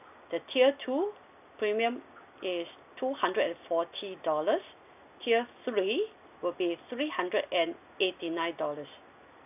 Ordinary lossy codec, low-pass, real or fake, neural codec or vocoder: none; 3.6 kHz; real; none